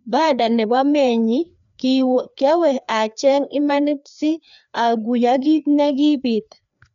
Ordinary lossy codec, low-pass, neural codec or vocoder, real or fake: none; 7.2 kHz; codec, 16 kHz, 2 kbps, FreqCodec, larger model; fake